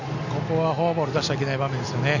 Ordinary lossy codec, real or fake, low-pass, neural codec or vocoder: none; real; 7.2 kHz; none